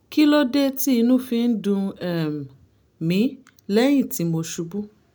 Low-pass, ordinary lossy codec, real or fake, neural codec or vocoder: none; none; real; none